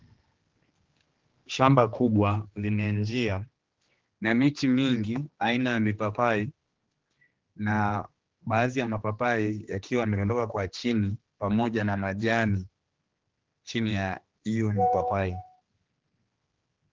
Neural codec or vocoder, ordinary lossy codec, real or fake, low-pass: codec, 16 kHz, 2 kbps, X-Codec, HuBERT features, trained on general audio; Opus, 16 kbps; fake; 7.2 kHz